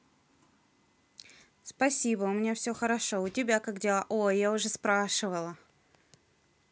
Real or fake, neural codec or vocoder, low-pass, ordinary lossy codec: real; none; none; none